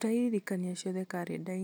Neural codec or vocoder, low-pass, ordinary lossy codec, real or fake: none; none; none; real